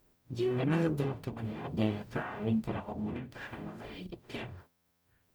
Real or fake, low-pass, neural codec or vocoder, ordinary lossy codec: fake; none; codec, 44.1 kHz, 0.9 kbps, DAC; none